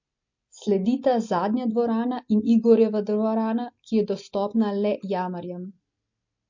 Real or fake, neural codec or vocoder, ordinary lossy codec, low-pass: real; none; MP3, 48 kbps; 7.2 kHz